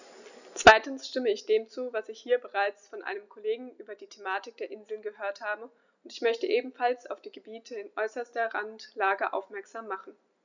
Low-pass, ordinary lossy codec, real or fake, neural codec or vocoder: 7.2 kHz; none; real; none